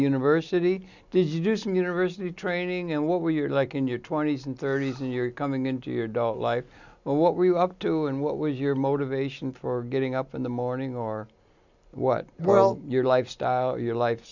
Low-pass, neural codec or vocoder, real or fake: 7.2 kHz; none; real